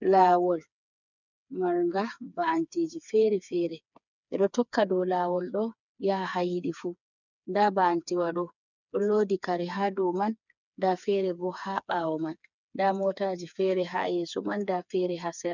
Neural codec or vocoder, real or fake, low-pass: codec, 16 kHz, 4 kbps, FreqCodec, smaller model; fake; 7.2 kHz